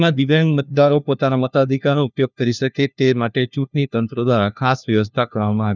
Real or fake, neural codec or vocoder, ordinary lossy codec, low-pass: fake; codec, 16 kHz, 1 kbps, FunCodec, trained on LibriTTS, 50 frames a second; none; 7.2 kHz